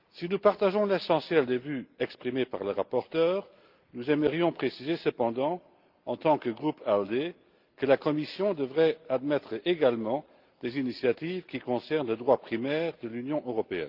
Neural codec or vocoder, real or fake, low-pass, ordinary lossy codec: none; real; 5.4 kHz; Opus, 24 kbps